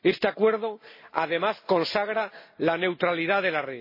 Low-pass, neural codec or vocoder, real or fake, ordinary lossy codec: 5.4 kHz; none; real; MP3, 24 kbps